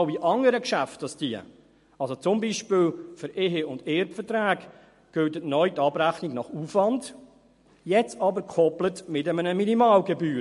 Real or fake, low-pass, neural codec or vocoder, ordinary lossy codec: real; 14.4 kHz; none; MP3, 48 kbps